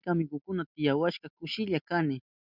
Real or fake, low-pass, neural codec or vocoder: real; 5.4 kHz; none